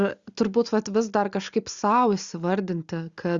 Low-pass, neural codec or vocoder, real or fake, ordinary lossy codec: 7.2 kHz; none; real; Opus, 64 kbps